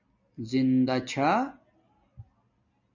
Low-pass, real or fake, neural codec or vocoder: 7.2 kHz; real; none